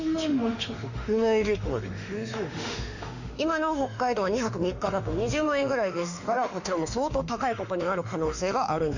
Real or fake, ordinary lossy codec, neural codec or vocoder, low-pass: fake; none; autoencoder, 48 kHz, 32 numbers a frame, DAC-VAE, trained on Japanese speech; 7.2 kHz